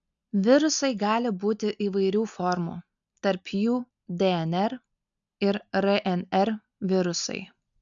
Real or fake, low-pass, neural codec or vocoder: real; 7.2 kHz; none